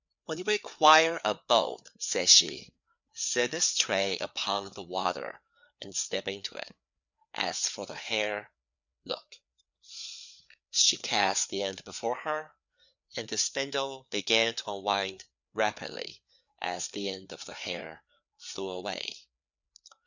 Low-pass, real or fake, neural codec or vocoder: 7.2 kHz; fake; codec, 16 kHz, 4 kbps, FreqCodec, larger model